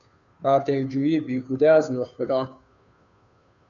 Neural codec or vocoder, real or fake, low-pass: codec, 16 kHz, 2 kbps, FunCodec, trained on Chinese and English, 25 frames a second; fake; 7.2 kHz